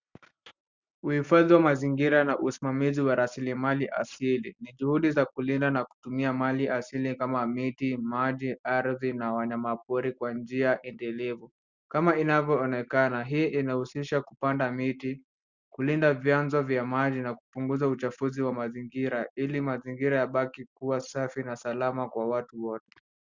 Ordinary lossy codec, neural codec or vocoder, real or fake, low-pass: Opus, 64 kbps; none; real; 7.2 kHz